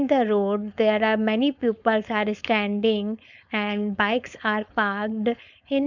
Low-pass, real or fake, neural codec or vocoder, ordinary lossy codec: 7.2 kHz; fake; codec, 16 kHz, 4.8 kbps, FACodec; none